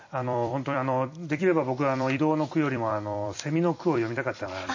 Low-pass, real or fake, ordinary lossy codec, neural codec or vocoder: 7.2 kHz; fake; MP3, 32 kbps; vocoder, 44.1 kHz, 128 mel bands every 256 samples, BigVGAN v2